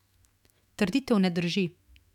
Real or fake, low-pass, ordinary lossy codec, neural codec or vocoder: fake; 19.8 kHz; none; autoencoder, 48 kHz, 128 numbers a frame, DAC-VAE, trained on Japanese speech